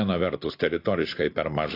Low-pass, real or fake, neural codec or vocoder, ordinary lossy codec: 5.4 kHz; real; none; AAC, 32 kbps